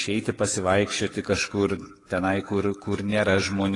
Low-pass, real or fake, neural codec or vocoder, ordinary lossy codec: 10.8 kHz; fake; codec, 44.1 kHz, 7.8 kbps, DAC; AAC, 32 kbps